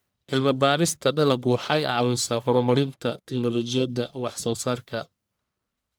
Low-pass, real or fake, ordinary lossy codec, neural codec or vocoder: none; fake; none; codec, 44.1 kHz, 1.7 kbps, Pupu-Codec